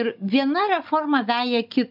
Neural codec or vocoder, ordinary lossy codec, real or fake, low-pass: codec, 16 kHz, 16 kbps, FunCodec, trained on Chinese and English, 50 frames a second; AAC, 48 kbps; fake; 5.4 kHz